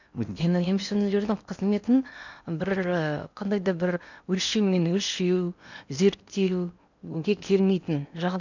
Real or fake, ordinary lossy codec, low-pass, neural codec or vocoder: fake; none; 7.2 kHz; codec, 16 kHz in and 24 kHz out, 0.8 kbps, FocalCodec, streaming, 65536 codes